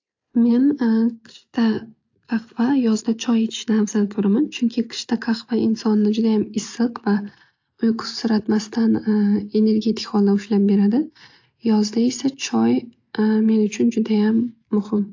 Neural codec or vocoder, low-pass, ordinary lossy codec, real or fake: none; 7.2 kHz; AAC, 48 kbps; real